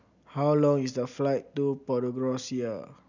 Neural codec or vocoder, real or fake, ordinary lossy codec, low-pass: none; real; none; 7.2 kHz